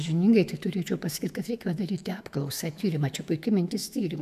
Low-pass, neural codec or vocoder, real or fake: 14.4 kHz; autoencoder, 48 kHz, 128 numbers a frame, DAC-VAE, trained on Japanese speech; fake